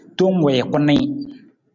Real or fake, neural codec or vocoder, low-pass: real; none; 7.2 kHz